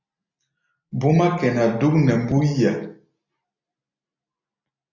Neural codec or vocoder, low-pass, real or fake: none; 7.2 kHz; real